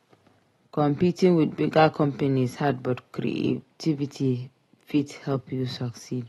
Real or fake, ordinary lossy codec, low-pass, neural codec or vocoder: real; AAC, 32 kbps; 19.8 kHz; none